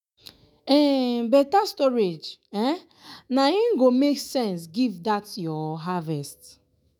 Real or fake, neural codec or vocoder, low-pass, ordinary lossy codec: fake; autoencoder, 48 kHz, 128 numbers a frame, DAC-VAE, trained on Japanese speech; none; none